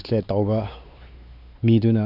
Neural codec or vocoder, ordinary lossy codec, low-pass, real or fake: codec, 16 kHz, 4 kbps, X-Codec, WavLM features, trained on Multilingual LibriSpeech; none; 5.4 kHz; fake